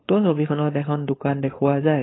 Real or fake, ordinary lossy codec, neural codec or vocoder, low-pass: fake; AAC, 16 kbps; codec, 16 kHz, 4.8 kbps, FACodec; 7.2 kHz